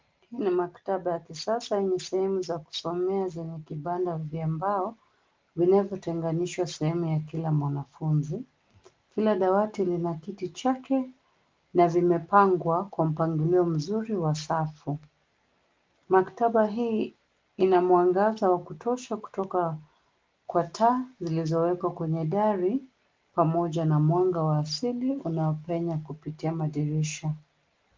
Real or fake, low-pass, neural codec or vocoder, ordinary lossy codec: real; 7.2 kHz; none; Opus, 32 kbps